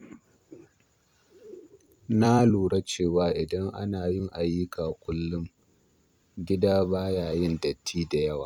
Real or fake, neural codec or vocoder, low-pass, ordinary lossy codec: fake; vocoder, 44.1 kHz, 128 mel bands every 256 samples, BigVGAN v2; 19.8 kHz; none